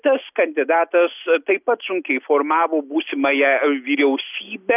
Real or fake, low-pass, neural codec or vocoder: real; 3.6 kHz; none